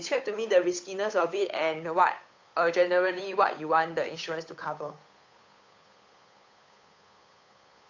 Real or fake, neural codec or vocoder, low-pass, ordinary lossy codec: fake; codec, 16 kHz, 8 kbps, FunCodec, trained on Chinese and English, 25 frames a second; 7.2 kHz; AAC, 48 kbps